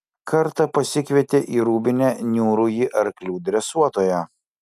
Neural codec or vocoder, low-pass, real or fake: none; 14.4 kHz; real